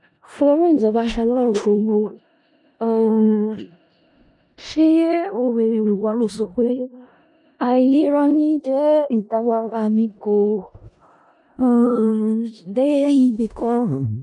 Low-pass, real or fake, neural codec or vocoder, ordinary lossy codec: 10.8 kHz; fake; codec, 16 kHz in and 24 kHz out, 0.4 kbps, LongCat-Audio-Codec, four codebook decoder; none